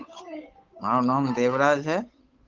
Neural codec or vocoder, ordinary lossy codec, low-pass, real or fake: codec, 16 kHz, 8 kbps, FunCodec, trained on Chinese and English, 25 frames a second; Opus, 24 kbps; 7.2 kHz; fake